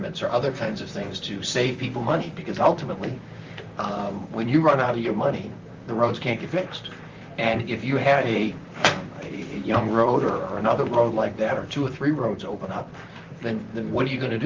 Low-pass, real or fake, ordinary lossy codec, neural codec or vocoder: 7.2 kHz; fake; Opus, 32 kbps; vocoder, 44.1 kHz, 128 mel bands, Pupu-Vocoder